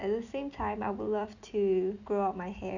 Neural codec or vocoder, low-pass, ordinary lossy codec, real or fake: none; 7.2 kHz; none; real